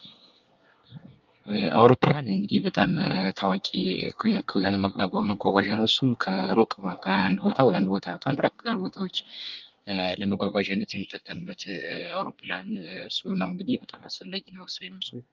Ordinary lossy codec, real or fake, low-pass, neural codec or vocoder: Opus, 32 kbps; fake; 7.2 kHz; codec, 24 kHz, 1 kbps, SNAC